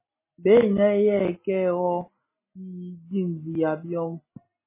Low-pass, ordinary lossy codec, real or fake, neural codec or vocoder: 3.6 kHz; MP3, 16 kbps; real; none